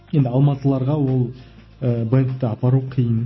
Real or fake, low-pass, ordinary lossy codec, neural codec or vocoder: real; 7.2 kHz; MP3, 24 kbps; none